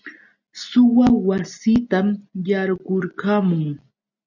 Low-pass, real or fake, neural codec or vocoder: 7.2 kHz; real; none